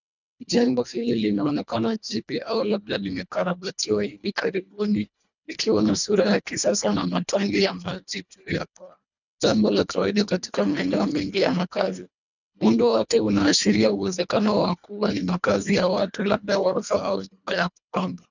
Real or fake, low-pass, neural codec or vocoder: fake; 7.2 kHz; codec, 24 kHz, 1.5 kbps, HILCodec